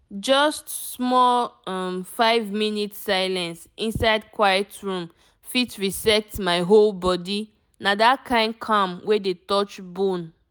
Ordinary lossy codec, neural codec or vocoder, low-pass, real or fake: none; none; none; real